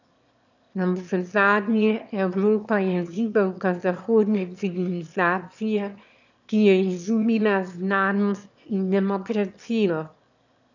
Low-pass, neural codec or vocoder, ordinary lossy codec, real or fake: 7.2 kHz; autoencoder, 22.05 kHz, a latent of 192 numbers a frame, VITS, trained on one speaker; none; fake